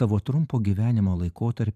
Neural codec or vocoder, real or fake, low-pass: vocoder, 44.1 kHz, 128 mel bands every 512 samples, BigVGAN v2; fake; 14.4 kHz